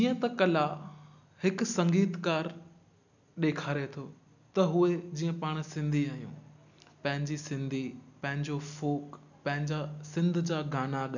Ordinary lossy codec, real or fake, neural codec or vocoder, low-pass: none; real; none; 7.2 kHz